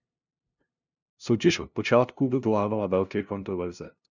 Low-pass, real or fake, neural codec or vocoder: 7.2 kHz; fake; codec, 16 kHz, 0.5 kbps, FunCodec, trained on LibriTTS, 25 frames a second